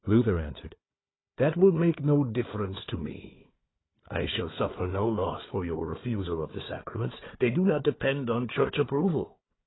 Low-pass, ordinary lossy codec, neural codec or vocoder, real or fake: 7.2 kHz; AAC, 16 kbps; codec, 16 kHz, 4 kbps, FunCodec, trained on Chinese and English, 50 frames a second; fake